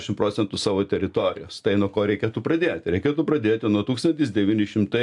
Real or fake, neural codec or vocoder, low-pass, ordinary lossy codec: real; none; 10.8 kHz; AAC, 64 kbps